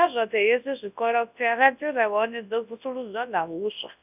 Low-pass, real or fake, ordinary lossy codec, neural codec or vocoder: 3.6 kHz; fake; none; codec, 24 kHz, 0.9 kbps, WavTokenizer, large speech release